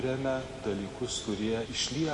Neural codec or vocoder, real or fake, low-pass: none; real; 10.8 kHz